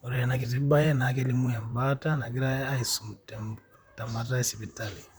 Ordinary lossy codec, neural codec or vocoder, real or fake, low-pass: none; vocoder, 44.1 kHz, 128 mel bands, Pupu-Vocoder; fake; none